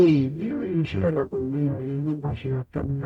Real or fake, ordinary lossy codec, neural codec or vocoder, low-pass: fake; none; codec, 44.1 kHz, 0.9 kbps, DAC; 19.8 kHz